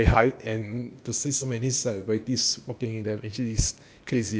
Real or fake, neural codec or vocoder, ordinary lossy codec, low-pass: fake; codec, 16 kHz, 0.8 kbps, ZipCodec; none; none